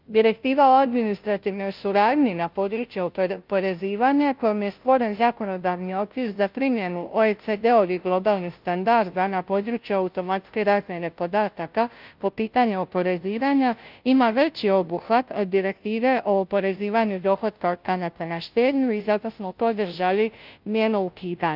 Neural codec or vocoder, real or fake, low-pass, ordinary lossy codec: codec, 16 kHz, 0.5 kbps, FunCodec, trained on Chinese and English, 25 frames a second; fake; 5.4 kHz; Opus, 24 kbps